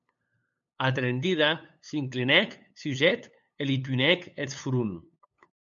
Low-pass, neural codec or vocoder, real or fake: 7.2 kHz; codec, 16 kHz, 8 kbps, FunCodec, trained on LibriTTS, 25 frames a second; fake